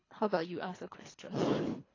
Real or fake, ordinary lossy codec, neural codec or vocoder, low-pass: fake; AAC, 32 kbps; codec, 24 kHz, 3 kbps, HILCodec; 7.2 kHz